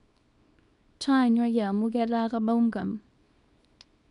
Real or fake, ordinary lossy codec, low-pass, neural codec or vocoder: fake; none; 10.8 kHz; codec, 24 kHz, 0.9 kbps, WavTokenizer, small release